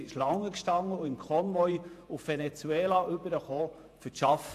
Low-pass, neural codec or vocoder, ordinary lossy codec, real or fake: 14.4 kHz; vocoder, 48 kHz, 128 mel bands, Vocos; AAC, 64 kbps; fake